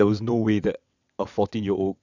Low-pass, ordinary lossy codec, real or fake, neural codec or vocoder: 7.2 kHz; none; fake; vocoder, 22.05 kHz, 80 mel bands, WaveNeXt